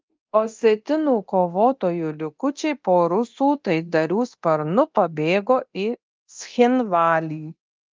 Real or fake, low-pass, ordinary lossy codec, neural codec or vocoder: fake; 7.2 kHz; Opus, 32 kbps; codec, 24 kHz, 0.9 kbps, DualCodec